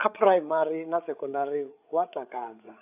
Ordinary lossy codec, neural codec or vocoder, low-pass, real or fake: AAC, 32 kbps; codec, 16 kHz, 8 kbps, FreqCodec, larger model; 3.6 kHz; fake